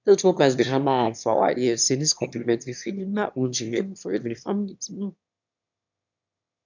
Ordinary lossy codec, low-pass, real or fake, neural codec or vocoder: none; 7.2 kHz; fake; autoencoder, 22.05 kHz, a latent of 192 numbers a frame, VITS, trained on one speaker